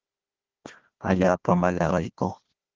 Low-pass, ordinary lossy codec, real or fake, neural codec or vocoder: 7.2 kHz; Opus, 16 kbps; fake; codec, 16 kHz, 1 kbps, FunCodec, trained on Chinese and English, 50 frames a second